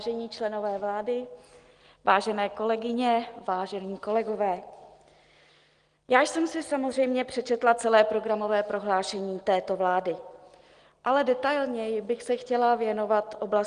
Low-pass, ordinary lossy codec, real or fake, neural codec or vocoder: 9.9 kHz; Opus, 24 kbps; real; none